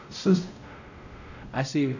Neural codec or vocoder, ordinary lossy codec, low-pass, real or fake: codec, 16 kHz, 0.5 kbps, X-Codec, WavLM features, trained on Multilingual LibriSpeech; none; 7.2 kHz; fake